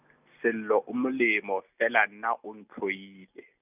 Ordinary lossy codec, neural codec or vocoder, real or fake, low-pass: none; none; real; 3.6 kHz